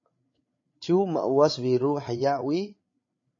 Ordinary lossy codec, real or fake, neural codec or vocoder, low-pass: MP3, 32 kbps; fake; codec, 16 kHz, 8 kbps, FreqCodec, larger model; 7.2 kHz